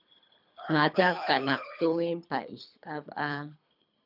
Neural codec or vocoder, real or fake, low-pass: codec, 24 kHz, 3 kbps, HILCodec; fake; 5.4 kHz